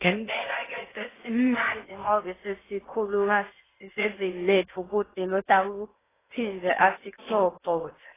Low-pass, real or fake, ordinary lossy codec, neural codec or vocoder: 3.6 kHz; fake; AAC, 16 kbps; codec, 16 kHz in and 24 kHz out, 0.6 kbps, FocalCodec, streaming, 4096 codes